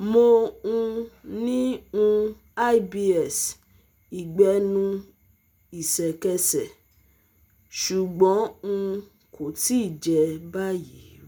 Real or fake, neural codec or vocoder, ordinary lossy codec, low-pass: real; none; none; none